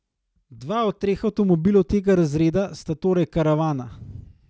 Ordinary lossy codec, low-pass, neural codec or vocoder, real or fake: none; none; none; real